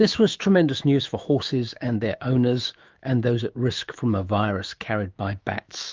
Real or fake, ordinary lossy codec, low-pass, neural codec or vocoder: fake; Opus, 32 kbps; 7.2 kHz; autoencoder, 48 kHz, 128 numbers a frame, DAC-VAE, trained on Japanese speech